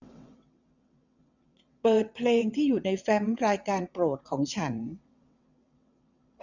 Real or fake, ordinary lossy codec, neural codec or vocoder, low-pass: fake; none; vocoder, 22.05 kHz, 80 mel bands, WaveNeXt; 7.2 kHz